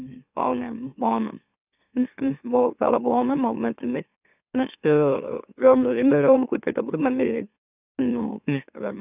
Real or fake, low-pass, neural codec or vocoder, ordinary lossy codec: fake; 3.6 kHz; autoencoder, 44.1 kHz, a latent of 192 numbers a frame, MeloTTS; none